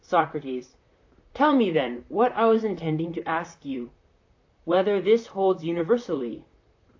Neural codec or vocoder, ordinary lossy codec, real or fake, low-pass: vocoder, 44.1 kHz, 128 mel bands, Pupu-Vocoder; AAC, 48 kbps; fake; 7.2 kHz